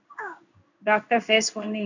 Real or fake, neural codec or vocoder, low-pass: fake; codec, 16 kHz in and 24 kHz out, 1 kbps, XY-Tokenizer; 7.2 kHz